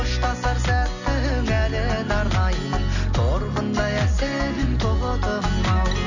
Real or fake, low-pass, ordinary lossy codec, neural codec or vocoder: real; 7.2 kHz; none; none